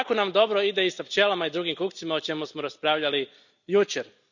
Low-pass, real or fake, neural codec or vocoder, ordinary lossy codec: 7.2 kHz; real; none; none